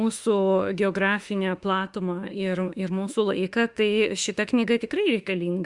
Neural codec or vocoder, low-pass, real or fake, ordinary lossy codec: autoencoder, 48 kHz, 32 numbers a frame, DAC-VAE, trained on Japanese speech; 10.8 kHz; fake; Opus, 64 kbps